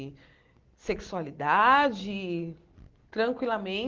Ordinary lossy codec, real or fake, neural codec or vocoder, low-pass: Opus, 16 kbps; real; none; 7.2 kHz